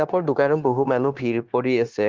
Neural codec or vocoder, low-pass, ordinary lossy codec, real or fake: codec, 16 kHz, 0.9 kbps, LongCat-Audio-Codec; 7.2 kHz; Opus, 16 kbps; fake